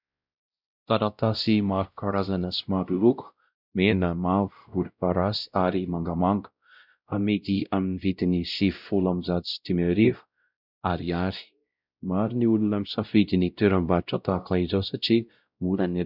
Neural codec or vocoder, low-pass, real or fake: codec, 16 kHz, 0.5 kbps, X-Codec, WavLM features, trained on Multilingual LibriSpeech; 5.4 kHz; fake